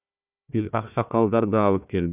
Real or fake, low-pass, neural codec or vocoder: fake; 3.6 kHz; codec, 16 kHz, 1 kbps, FunCodec, trained on Chinese and English, 50 frames a second